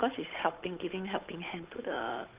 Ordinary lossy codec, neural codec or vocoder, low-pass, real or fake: Opus, 32 kbps; codec, 16 kHz, 8 kbps, FunCodec, trained on Chinese and English, 25 frames a second; 3.6 kHz; fake